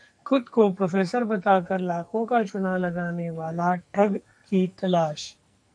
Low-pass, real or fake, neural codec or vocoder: 9.9 kHz; fake; codec, 44.1 kHz, 2.6 kbps, SNAC